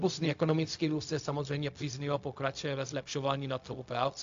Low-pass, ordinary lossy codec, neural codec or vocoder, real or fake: 7.2 kHz; AAC, 64 kbps; codec, 16 kHz, 0.4 kbps, LongCat-Audio-Codec; fake